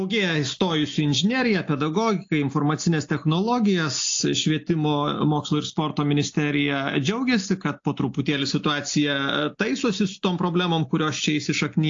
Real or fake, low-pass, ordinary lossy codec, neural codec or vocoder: real; 7.2 kHz; AAC, 48 kbps; none